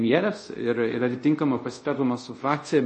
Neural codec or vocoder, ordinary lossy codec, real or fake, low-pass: codec, 24 kHz, 0.5 kbps, DualCodec; MP3, 32 kbps; fake; 10.8 kHz